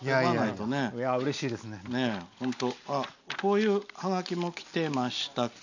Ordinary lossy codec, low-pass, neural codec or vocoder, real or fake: none; 7.2 kHz; none; real